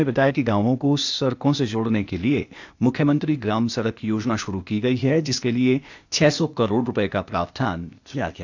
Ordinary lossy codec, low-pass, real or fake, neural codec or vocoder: none; 7.2 kHz; fake; codec, 16 kHz, 0.8 kbps, ZipCodec